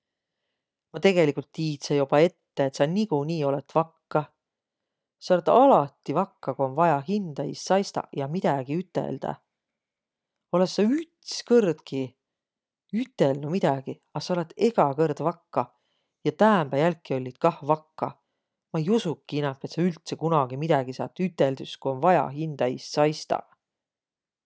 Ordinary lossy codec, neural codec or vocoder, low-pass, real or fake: none; none; none; real